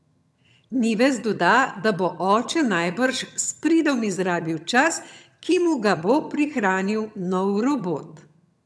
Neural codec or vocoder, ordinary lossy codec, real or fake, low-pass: vocoder, 22.05 kHz, 80 mel bands, HiFi-GAN; none; fake; none